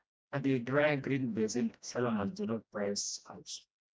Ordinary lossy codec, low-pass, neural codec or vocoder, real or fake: none; none; codec, 16 kHz, 1 kbps, FreqCodec, smaller model; fake